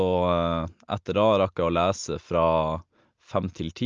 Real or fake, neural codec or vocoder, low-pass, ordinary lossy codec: real; none; 7.2 kHz; Opus, 24 kbps